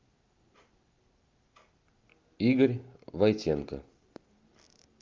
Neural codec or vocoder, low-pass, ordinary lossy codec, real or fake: none; 7.2 kHz; Opus, 32 kbps; real